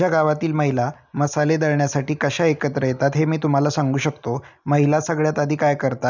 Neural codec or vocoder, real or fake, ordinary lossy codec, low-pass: none; real; none; 7.2 kHz